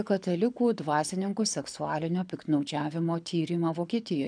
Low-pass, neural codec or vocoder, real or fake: 9.9 kHz; codec, 24 kHz, 6 kbps, HILCodec; fake